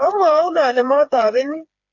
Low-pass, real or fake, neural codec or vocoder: 7.2 kHz; fake; codec, 16 kHz, 4 kbps, FreqCodec, smaller model